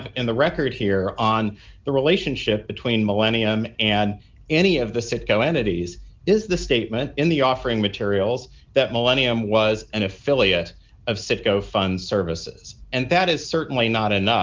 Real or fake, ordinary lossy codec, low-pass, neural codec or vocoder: real; Opus, 24 kbps; 7.2 kHz; none